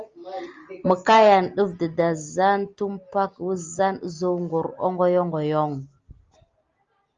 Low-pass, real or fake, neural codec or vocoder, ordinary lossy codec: 7.2 kHz; real; none; Opus, 32 kbps